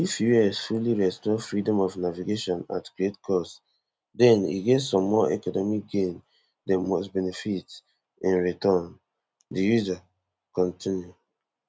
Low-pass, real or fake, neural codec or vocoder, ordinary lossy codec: none; real; none; none